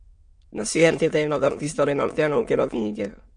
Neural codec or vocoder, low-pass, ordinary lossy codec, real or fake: autoencoder, 22.05 kHz, a latent of 192 numbers a frame, VITS, trained on many speakers; 9.9 kHz; MP3, 48 kbps; fake